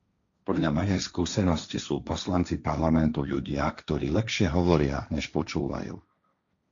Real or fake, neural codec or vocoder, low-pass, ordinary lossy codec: fake; codec, 16 kHz, 1.1 kbps, Voila-Tokenizer; 7.2 kHz; MP3, 64 kbps